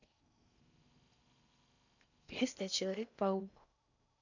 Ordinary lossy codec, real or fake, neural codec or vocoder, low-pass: none; fake; codec, 16 kHz in and 24 kHz out, 0.6 kbps, FocalCodec, streaming, 4096 codes; 7.2 kHz